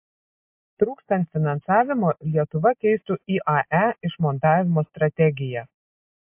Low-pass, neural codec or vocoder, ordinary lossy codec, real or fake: 3.6 kHz; none; MP3, 32 kbps; real